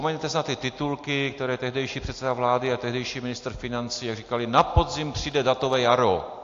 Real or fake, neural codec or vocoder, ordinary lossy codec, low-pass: real; none; AAC, 48 kbps; 7.2 kHz